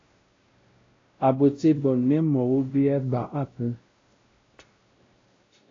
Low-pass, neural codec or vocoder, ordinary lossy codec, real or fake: 7.2 kHz; codec, 16 kHz, 0.5 kbps, X-Codec, WavLM features, trained on Multilingual LibriSpeech; AAC, 32 kbps; fake